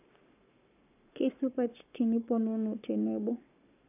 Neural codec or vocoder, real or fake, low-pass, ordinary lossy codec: codec, 44.1 kHz, 7.8 kbps, Pupu-Codec; fake; 3.6 kHz; none